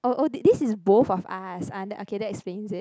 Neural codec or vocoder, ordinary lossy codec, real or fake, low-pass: none; none; real; none